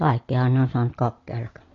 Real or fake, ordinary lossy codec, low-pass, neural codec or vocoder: real; AAC, 32 kbps; 7.2 kHz; none